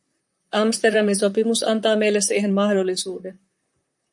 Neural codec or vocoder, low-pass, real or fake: vocoder, 44.1 kHz, 128 mel bands, Pupu-Vocoder; 10.8 kHz; fake